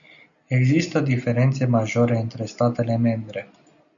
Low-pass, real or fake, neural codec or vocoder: 7.2 kHz; real; none